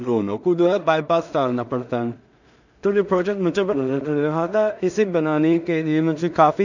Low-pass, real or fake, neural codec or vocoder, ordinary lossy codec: 7.2 kHz; fake; codec, 16 kHz in and 24 kHz out, 0.4 kbps, LongCat-Audio-Codec, two codebook decoder; none